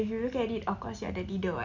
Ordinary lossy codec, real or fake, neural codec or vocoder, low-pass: none; real; none; 7.2 kHz